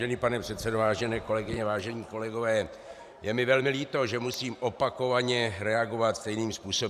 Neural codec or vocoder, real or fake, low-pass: vocoder, 44.1 kHz, 128 mel bands every 512 samples, BigVGAN v2; fake; 14.4 kHz